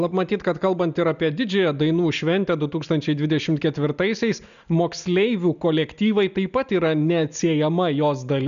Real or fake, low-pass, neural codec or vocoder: real; 7.2 kHz; none